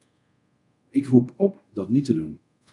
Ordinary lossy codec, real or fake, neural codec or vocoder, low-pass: MP3, 96 kbps; fake; codec, 24 kHz, 0.5 kbps, DualCodec; 10.8 kHz